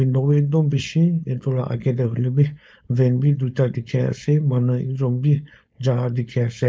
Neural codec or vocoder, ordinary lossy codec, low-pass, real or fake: codec, 16 kHz, 4.8 kbps, FACodec; none; none; fake